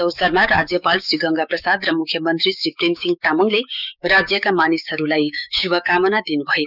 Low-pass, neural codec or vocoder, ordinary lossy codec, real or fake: 5.4 kHz; codec, 16 kHz, 6 kbps, DAC; none; fake